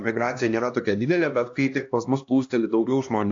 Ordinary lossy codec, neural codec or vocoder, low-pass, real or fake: AAC, 48 kbps; codec, 16 kHz, 1 kbps, X-Codec, HuBERT features, trained on LibriSpeech; 7.2 kHz; fake